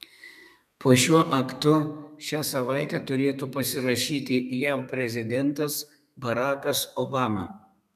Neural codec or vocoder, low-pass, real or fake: codec, 32 kHz, 1.9 kbps, SNAC; 14.4 kHz; fake